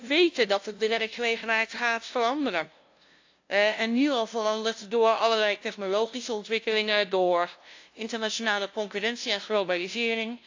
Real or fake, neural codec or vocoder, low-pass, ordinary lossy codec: fake; codec, 16 kHz, 0.5 kbps, FunCodec, trained on LibriTTS, 25 frames a second; 7.2 kHz; AAC, 48 kbps